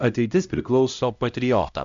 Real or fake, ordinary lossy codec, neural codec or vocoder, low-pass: fake; Opus, 64 kbps; codec, 16 kHz, 0.5 kbps, X-Codec, HuBERT features, trained on LibriSpeech; 7.2 kHz